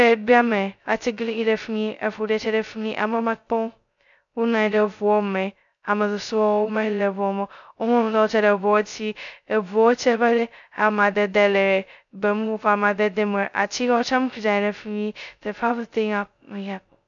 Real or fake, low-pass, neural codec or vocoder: fake; 7.2 kHz; codec, 16 kHz, 0.2 kbps, FocalCodec